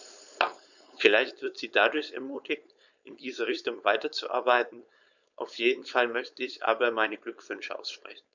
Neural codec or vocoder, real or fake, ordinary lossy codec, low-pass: codec, 16 kHz, 4.8 kbps, FACodec; fake; none; 7.2 kHz